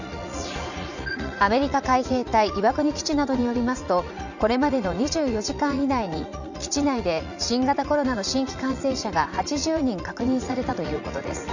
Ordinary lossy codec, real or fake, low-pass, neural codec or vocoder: none; fake; 7.2 kHz; vocoder, 44.1 kHz, 80 mel bands, Vocos